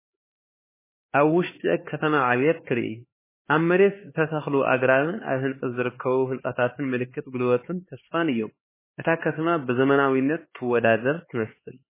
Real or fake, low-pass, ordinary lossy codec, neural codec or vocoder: fake; 3.6 kHz; MP3, 16 kbps; codec, 16 kHz, 4 kbps, X-Codec, HuBERT features, trained on LibriSpeech